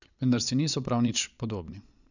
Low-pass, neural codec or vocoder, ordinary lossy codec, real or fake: 7.2 kHz; vocoder, 22.05 kHz, 80 mel bands, Vocos; none; fake